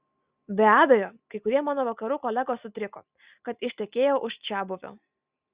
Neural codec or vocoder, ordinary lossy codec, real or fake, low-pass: none; Opus, 64 kbps; real; 3.6 kHz